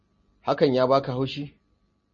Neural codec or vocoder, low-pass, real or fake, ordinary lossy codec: none; 7.2 kHz; real; MP3, 32 kbps